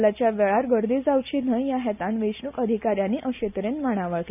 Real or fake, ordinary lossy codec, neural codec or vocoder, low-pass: real; none; none; 3.6 kHz